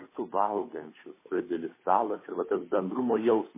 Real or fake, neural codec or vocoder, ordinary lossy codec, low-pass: fake; codec, 16 kHz, 8 kbps, FunCodec, trained on Chinese and English, 25 frames a second; MP3, 16 kbps; 3.6 kHz